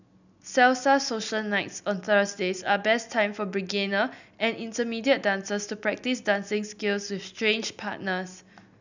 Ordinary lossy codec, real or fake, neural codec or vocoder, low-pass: none; real; none; 7.2 kHz